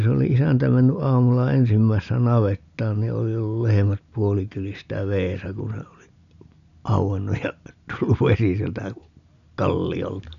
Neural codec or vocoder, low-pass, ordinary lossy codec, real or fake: none; 7.2 kHz; none; real